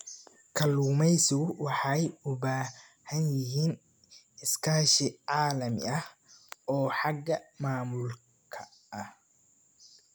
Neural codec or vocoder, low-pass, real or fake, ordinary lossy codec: none; none; real; none